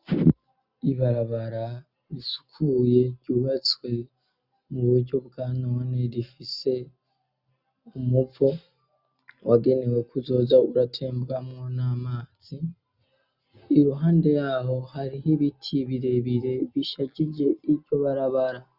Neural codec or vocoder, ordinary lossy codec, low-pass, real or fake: none; Opus, 64 kbps; 5.4 kHz; real